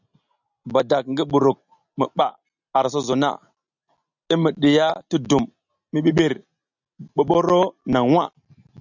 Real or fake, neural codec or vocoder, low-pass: real; none; 7.2 kHz